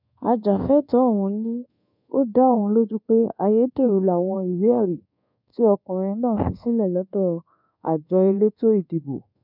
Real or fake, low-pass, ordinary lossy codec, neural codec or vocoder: fake; 5.4 kHz; none; codec, 24 kHz, 1.2 kbps, DualCodec